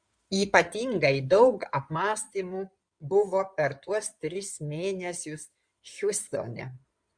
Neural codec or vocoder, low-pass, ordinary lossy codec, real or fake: codec, 16 kHz in and 24 kHz out, 2.2 kbps, FireRedTTS-2 codec; 9.9 kHz; Opus, 64 kbps; fake